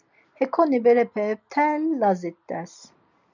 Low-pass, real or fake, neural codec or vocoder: 7.2 kHz; real; none